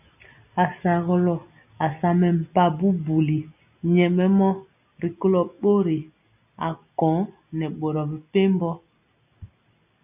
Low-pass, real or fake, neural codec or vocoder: 3.6 kHz; real; none